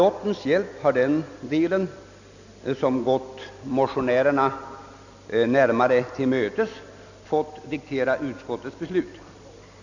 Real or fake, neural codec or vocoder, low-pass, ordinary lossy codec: real; none; 7.2 kHz; none